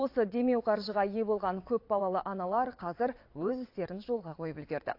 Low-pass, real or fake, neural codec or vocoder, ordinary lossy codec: 5.4 kHz; fake; vocoder, 22.05 kHz, 80 mel bands, WaveNeXt; AAC, 32 kbps